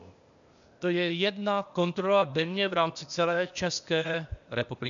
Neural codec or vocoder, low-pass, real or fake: codec, 16 kHz, 0.8 kbps, ZipCodec; 7.2 kHz; fake